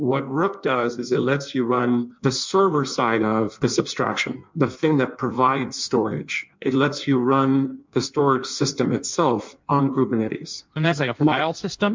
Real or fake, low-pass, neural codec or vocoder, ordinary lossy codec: fake; 7.2 kHz; codec, 16 kHz in and 24 kHz out, 1.1 kbps, FireRedTTS-2 codec; MP3, 64 kbps